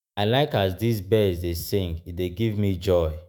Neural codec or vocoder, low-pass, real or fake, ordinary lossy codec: autoencoder, 48 kHz, 128 numbers a frame, DAC-VAE, trained on Japanese speech; none; fake; none